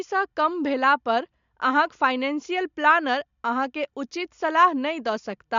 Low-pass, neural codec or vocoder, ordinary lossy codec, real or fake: 7.2 kHz; none; MP3, 64 kbps; real